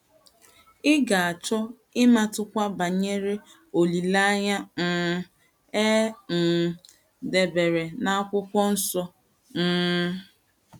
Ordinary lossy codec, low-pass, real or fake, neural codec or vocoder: none; 19.8 kHz; real; none